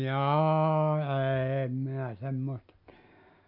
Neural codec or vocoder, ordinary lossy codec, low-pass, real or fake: none; MP3, 48 kbps; 5.4 kHz; real